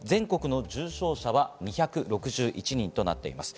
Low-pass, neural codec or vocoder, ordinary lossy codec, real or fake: none; none; none; real